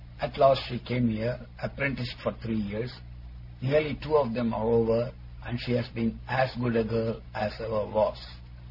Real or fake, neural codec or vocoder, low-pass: real; none; 5.4 kHz